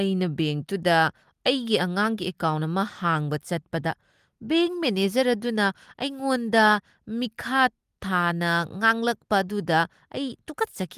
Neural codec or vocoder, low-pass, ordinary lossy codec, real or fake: none; 14.4 kHz; Opus, 24 kbps; real